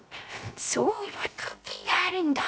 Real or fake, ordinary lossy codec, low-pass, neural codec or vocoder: fake; none; none; codec, 16 kHz, 0.3 kbps, FocalCodec